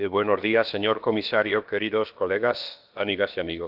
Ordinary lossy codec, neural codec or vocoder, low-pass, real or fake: Opus, 32 kbps; codec, 16 kHz, about 1 kbps, DyCAST, with the encoder's durations; 5.4 kHz; fake